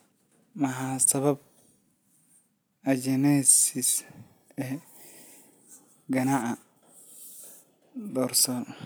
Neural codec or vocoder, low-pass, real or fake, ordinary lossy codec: none; none; real; none